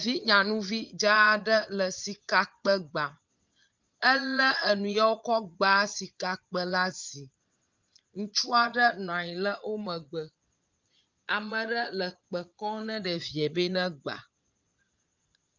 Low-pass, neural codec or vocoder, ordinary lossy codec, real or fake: 7.2 kHz; vocoder, 22.05 kHz, 80 mel bands, Vocos; Opus, 32 kbps; fake